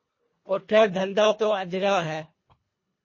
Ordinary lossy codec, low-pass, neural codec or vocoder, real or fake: MP3, 32 kbps; 7.2 kHz; codec, 24 kHz, 1.5 kbps, HILCodec; fake